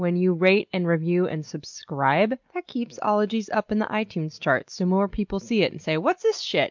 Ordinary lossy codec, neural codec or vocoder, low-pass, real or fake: MP3, 64 kbps; none; 7.2 kHz; real